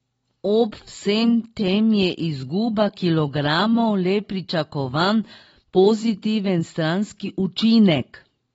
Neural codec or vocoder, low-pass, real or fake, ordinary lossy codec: none; 19.8 kHz; real; AAC, 24 kbps